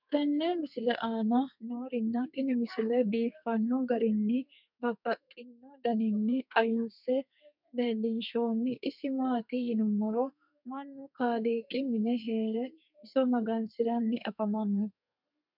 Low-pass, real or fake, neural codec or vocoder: 5.4 kHz; fake; codec, 32 kHz, 1.9 kbps, SNAC